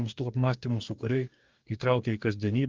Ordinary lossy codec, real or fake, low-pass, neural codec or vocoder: Opus, 24 kbps; fake; 7.2 kHz; codec, 44.1 kHz, 2.6 kbps, DAC